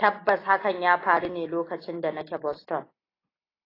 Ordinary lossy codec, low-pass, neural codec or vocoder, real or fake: AAC, 24 kbps; 5.4 kHz; none; real